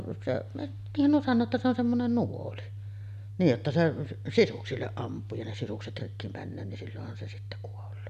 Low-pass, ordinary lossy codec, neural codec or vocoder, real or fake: 14.4 kHz; none; none; real